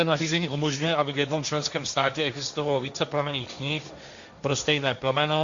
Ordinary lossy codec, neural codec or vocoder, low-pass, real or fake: Opus, 64 kbps; codec, 16 kHz, 1.1 kbps, Voila-Tokenizer; 7.2 kHz; fake